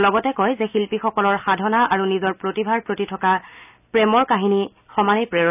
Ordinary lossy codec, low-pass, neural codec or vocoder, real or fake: none; 3.6 kHz; none; real